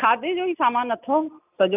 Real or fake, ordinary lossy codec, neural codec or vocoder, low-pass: real; none; none; 3.6 kHz